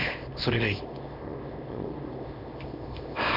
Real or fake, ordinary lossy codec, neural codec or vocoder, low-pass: fake; none; codec, 24 kHz, 0.9 kbps, WavTokenizer, small release; 5.4 kHz